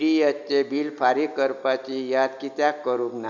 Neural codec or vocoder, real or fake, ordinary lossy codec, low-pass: none; real; none; 7.2 kHz